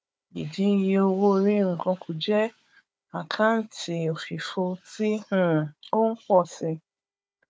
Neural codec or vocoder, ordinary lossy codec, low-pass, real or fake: codec, 16 kHz, 4 kbps, FunCodec, trained on Chinese and English, 50 frames a second; none; none; fake